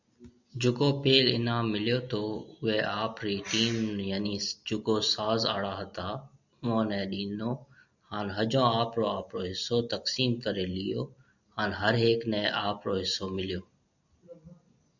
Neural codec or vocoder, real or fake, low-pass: none; real; 7.2 kHz